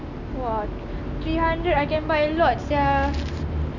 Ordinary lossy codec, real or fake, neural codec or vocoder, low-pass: none; real; none; 7.2 kHz